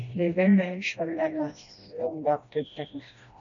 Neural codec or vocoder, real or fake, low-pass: codec, 16 kHz, 1 kbps, FreqCodec, smaller model; fake; 7.2 kHz